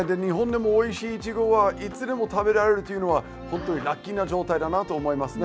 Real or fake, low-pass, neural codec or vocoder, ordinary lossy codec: real; none; none; none